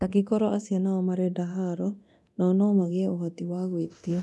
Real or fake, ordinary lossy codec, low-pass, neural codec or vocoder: fake; none; none; codec, 24 kHz, 0.9 kbps, DualCodec